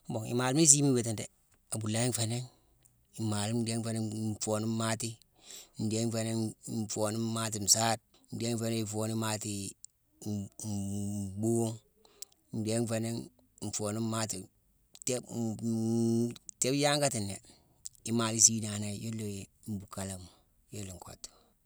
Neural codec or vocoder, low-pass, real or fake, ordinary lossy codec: none; none; real; none